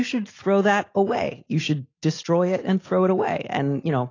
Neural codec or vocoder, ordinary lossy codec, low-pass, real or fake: none; AAC, 32 kbps; 7.2 kHz; real